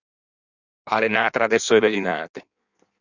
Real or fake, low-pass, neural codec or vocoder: fake; 7.2 kHz; codec, 16 kHz in and 24 kHz out, 1.1 kbps, FireRedTTS-2 codec